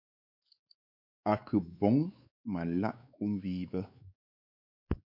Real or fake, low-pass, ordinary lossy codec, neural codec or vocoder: fake; 5.4 kHz; MP3, 48 kbps; codec, 16 kHz, 4 kbps, X-Codec, WavLM features, trained on Multilingual LibriSpeech